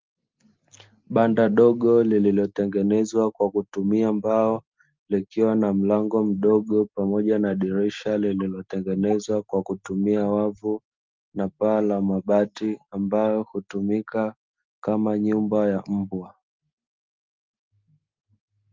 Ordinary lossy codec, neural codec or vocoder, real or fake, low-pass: Opus, 32 kbps; none; real; 7.2 kHz